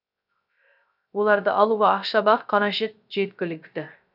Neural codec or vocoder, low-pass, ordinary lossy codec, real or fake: codec, 16 kHz, 0.3 kbps, FocalCodec; 5.4 kHz; none; fake